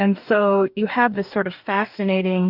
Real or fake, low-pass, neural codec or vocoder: fake; 5.4 kHz; codec, 44.1 kHz, 2.6 kbps, DAC